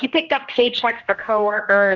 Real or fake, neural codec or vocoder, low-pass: fake; codec, 16 kHz, 1 kbps, X-Codec, HuBERT features, trained on general audio; 7.2 kHz